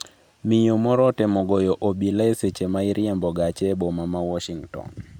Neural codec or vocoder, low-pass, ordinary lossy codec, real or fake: none; 19.8 kHz; none; real